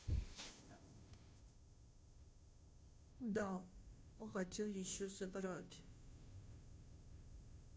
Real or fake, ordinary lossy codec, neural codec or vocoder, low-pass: fake; none; codec, 16 kHz, 0.5 kbps, FunCodec, trained on Chinese and English, 25 frames a second; none